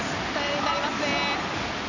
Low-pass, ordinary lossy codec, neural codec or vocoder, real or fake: 7.2 kHz; none; none; real